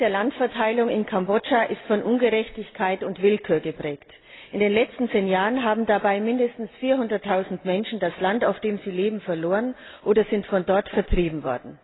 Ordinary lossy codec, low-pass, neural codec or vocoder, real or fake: AAC, 16 kbps; 7.2 kHz; none; real